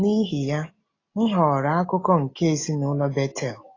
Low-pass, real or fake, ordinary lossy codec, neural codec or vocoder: 7.2 kHz; real; AAC, 32 kbps; none